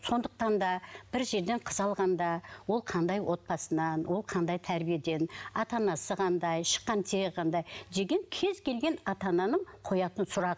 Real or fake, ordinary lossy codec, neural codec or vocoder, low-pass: real; none; none; none